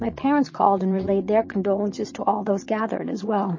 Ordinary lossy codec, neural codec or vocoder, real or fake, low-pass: MP3, 32 kbps; vocoder, 22.05 kHz, 80 mel bands, Vocos; fake; 7.2 kHz